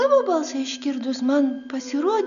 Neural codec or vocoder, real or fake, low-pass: none; real; 7.2 kHz